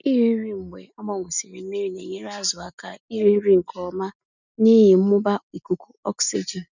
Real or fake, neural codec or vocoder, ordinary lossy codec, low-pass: real; none; none; 7.2 kHz